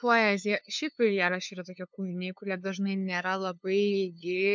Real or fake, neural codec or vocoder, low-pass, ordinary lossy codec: fake; codec, 16 kHz, 2 kbps, FunCodec, trained on LibriTTS, 25 frames a second; 7.2 kHz; MP3, 64 kbps